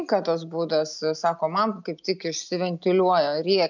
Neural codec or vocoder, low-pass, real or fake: none; 7.2 kHz; real